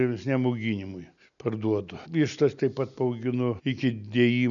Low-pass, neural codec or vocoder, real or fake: 7.2 kHz; none; real